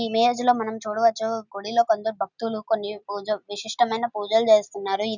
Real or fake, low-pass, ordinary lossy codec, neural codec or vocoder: real; 7.2 kHz; none; none